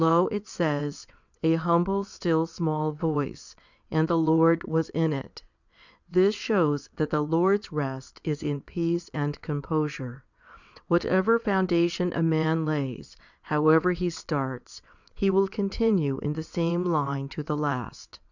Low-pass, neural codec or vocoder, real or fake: 7.2 kHz; vocoder, 22.05 kHz, 80 mel bands, WaveNeXt; fake